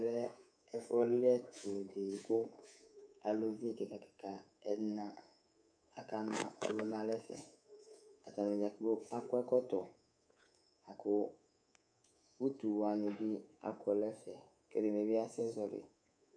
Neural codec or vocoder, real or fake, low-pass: codec, 24 kHz, 3.1 kbps, DualCodec; fake; 9.9 kHz